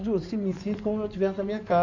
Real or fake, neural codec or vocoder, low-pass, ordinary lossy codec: fake; codec, 16 kHz in and 24 kHz out, 2.2 kbps, FireRedTTS-2 codec; 7.2 kHz; none